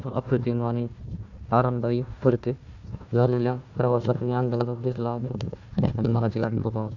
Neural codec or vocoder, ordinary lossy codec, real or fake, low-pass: codec, 16 kHz, 1 kbps, FunCodec, trained on Chinese and English, 50 frames a second; none; fake; 7.2 kHz